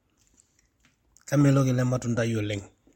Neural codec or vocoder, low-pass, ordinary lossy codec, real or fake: none; 19.8 kHz; MP3, 64 kbps; real